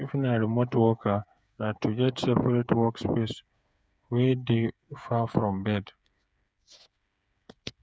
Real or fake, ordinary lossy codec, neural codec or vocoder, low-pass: fake; none; codec, 16 kHz, 8 kbps, FreqCodec, smaller model; none